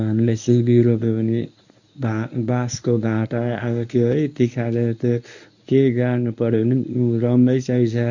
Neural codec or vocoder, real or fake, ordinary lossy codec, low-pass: codec, 24 kHz, 0.9 kbps, WavTokenizer, medium speech release version 1; fake; none; 7.2 kHz